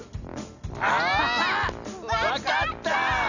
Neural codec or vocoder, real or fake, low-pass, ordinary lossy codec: none; real; 7.2 kHz; none